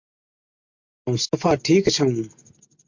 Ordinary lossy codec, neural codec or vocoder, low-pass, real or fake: MP3, 48 kbps; none; 7.2 kHz; real